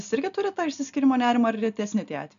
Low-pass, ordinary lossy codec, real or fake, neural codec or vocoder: 7.2 kHz; MP3, 96 kbps; real; none